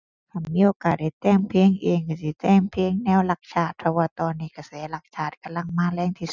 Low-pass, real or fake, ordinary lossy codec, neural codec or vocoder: 7.2 kHz; real; none; none